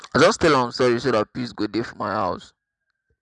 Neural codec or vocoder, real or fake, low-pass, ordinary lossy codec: none; real; 9.9 kHz; none